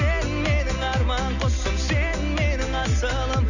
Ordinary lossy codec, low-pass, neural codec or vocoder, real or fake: none; 7.2 kHz; none; real